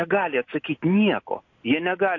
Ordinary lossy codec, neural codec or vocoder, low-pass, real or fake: AAC, 48 kbps; none; 7.2 kHz; real